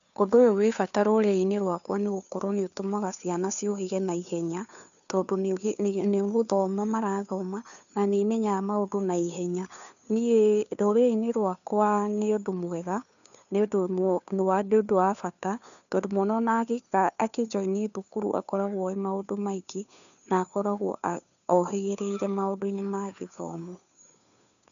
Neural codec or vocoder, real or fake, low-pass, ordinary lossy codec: codec, 16 kHz, 2 kbps, FunCodec, trained on Chinese and English, 25 frames a second; fake; 7.2 kHz; none